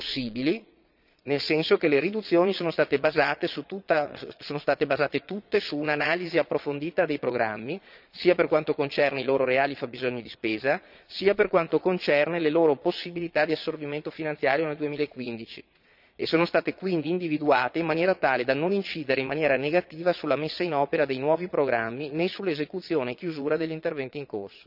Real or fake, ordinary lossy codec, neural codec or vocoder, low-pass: fake; none; vocoder, 22.05 kHz, 80 mel bands, Vocos; 5.4 kHz